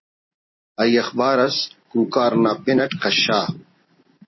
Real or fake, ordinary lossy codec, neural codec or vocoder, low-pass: real; MP3, 24 kbps; none; 7.2 kHz